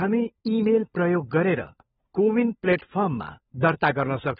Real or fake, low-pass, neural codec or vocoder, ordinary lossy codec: fake; 7.2 kHz; codec, 16 kHz, 16 kbps, FunCodec, trained on LibriTTS, 50 frames a second; AAC, 16 kbps